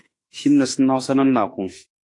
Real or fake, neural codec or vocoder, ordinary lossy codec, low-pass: fake; autoencoder, 48 kHz, 32 numbers a frame, DAC-VAE, trained on Japanese speech; AAC, 48 kbps; 10.8 kHz